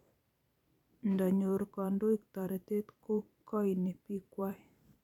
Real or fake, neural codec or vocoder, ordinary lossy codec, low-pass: fake; vocoder, 44.1 kHz, 128 mel bands every 512 samples, BigVGAN v2; none; 19.8 kHz